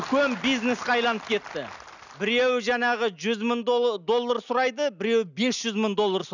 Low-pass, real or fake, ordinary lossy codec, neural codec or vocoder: 7.2 kHz; real; none; none